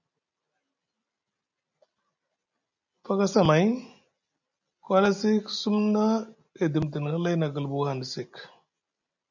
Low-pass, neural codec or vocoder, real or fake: 7.2 kHz; none; real